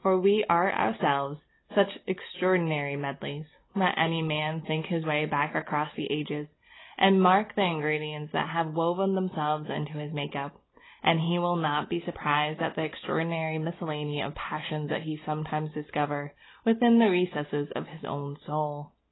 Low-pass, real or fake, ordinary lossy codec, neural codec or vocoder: 7.2 kHz; real; AAC, 16 kbps; none